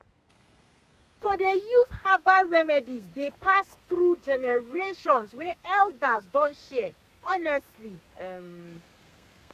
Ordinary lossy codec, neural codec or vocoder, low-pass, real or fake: none; codec, 32 kHz, 1.9 kbps, SNAC; 14.4 kHz; fake